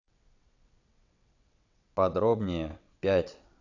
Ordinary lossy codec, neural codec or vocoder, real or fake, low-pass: none; vocoder, 22.05 kHz, 80 mel bands, WaveNeXt; fake; 7.2 kHz